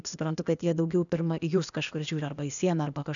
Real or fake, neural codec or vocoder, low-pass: fake; codec, 16 kHz, 0.8 kbps, ZipCodec; 7.2 kHz